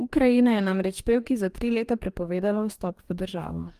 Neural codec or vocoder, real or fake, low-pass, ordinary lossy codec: codec, 44.1 kHz, 2.6 kbps, DAC; fake; 14.4 kHz; Opus, 32 kbps